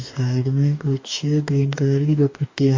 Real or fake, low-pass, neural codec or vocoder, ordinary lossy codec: fake; 7.2 kHz; codec, 44.1 kHz, 2.6 kbps, DAC; MP3, 48 kbps